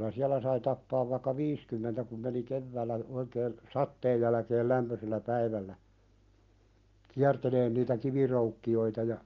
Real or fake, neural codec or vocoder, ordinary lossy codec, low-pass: real; none; Opus, 24 kbps; 7.2 kHz